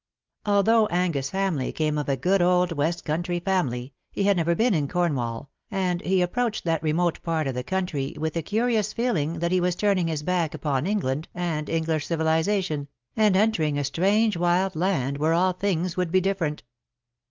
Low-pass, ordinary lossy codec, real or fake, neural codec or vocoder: 7.2 kHz; Opus, 32 kbps; real; none